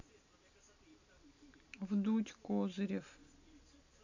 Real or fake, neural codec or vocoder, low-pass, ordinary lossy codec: real; none; 7.2 kHz; none